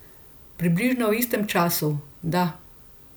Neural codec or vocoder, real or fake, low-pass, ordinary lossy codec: none; real; none; none